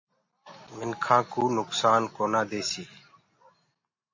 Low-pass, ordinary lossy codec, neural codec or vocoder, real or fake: 7.2 kHz; MP3, 32 kbps; none; real